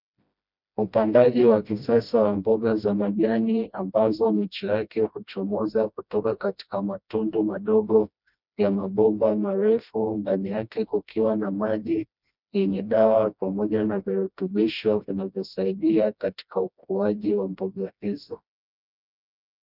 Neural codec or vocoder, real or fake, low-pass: codec, 16 kHz, 1 kbps, FreqCodec, smaller model; fake; 5.4 kHz